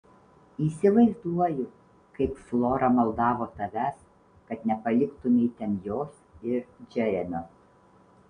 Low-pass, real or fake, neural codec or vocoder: 9.9 kHz; real; none